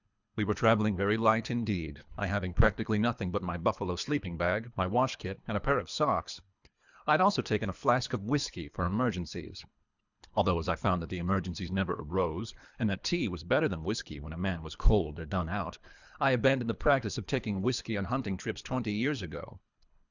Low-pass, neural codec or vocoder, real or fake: 7.2 kHz; codec, 24 kHz, 3 kbps, HILCodec; fake